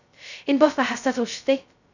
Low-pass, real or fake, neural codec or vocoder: 7.2 kHz; fake; codec, 16 kHz, 0.2 kbps, FocalCodec